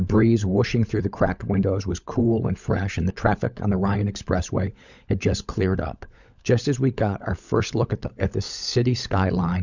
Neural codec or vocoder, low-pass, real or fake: codec, 16 kHz, 16 kbps, FunCodec, trained on LibriTTS, 50 frames a second; 7.2 kHz; fake